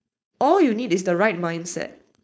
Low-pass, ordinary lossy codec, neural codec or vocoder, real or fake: none; none; codec, 16 kHz, 4.8 kbps, FACodec; fake